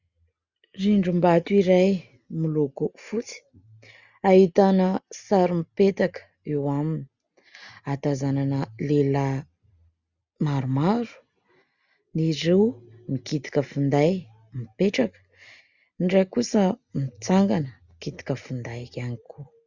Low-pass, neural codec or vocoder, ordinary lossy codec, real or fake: 7.2 kHz; none; Opus, 64 kbps; real